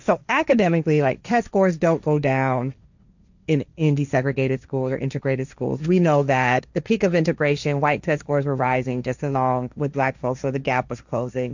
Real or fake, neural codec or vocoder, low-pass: fake; codec, 16 kHz, 1.1 kbps, Voila-Tokenizer; 7.2 kHz